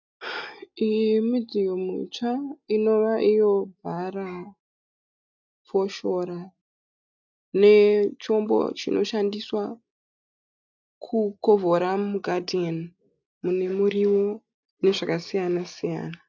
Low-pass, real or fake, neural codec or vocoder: 7.2 kHz; real; none